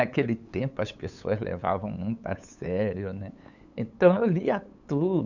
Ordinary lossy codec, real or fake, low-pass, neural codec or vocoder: none; fake; 7.2 kHz; codec, 16 kHz, 8 kbps, FunCodec, trained on LibriTTS, 25 frames a second